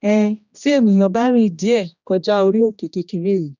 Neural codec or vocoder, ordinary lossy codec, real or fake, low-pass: codec, 16 kHz, 1 kbps, X-Codec, HuBERT features, trained on general audio; none; fake; 7.2 kHz